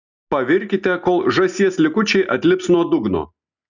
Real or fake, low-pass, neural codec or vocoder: real; 7.2 kHz; none